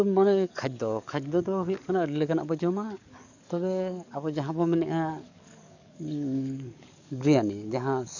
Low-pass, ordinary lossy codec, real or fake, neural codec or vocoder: 7.2 kHz; none; fake; codec, 44.1 kHz, 7.8 kbps, DAC